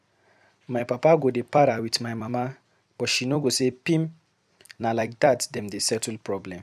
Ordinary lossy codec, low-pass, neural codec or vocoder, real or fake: none; 14.4 kHz; vocoder, 44.1 kHz, 128 mel bands every 256 samples, BigVGAN v2; fake